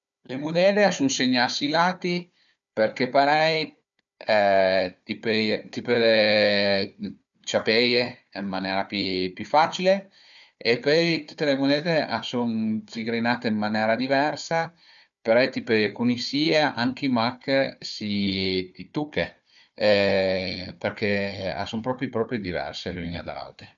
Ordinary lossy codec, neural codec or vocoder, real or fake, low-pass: none; codec, 16 kHz, 4 kbps, FunCodec, trained on Chinese and English, 50 frames a second; fake; 7.2 kHz